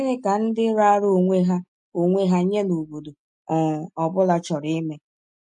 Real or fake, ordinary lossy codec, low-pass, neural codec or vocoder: real; MP3, 48 kbps; 10.8 kHz; none